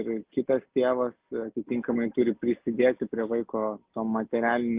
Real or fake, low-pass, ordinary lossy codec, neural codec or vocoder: real; 3.6 kHz; Opus, 32 kbps; none